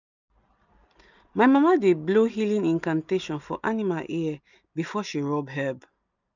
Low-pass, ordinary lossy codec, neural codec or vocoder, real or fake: 7.2 kHz; none; none; real